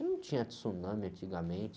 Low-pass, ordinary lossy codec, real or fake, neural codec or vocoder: none; none; real; none